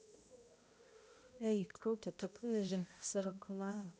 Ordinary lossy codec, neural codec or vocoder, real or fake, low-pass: none; codec, 16 kHz, 0.5 kbps, X-Codec, HuBERT features, trained on balanced general audio; fake; none